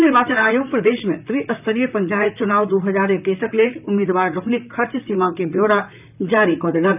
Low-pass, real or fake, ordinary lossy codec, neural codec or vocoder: 3.6 kHz; fake; none; vocoder, 44.1 kHz, 128 mel bands, Pupu-Vocoder